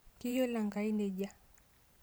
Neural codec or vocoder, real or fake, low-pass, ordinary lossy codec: vocoder, 44.1 kHz, 128 mel bands every 512 samples, BigVGAN v2; fake; none; none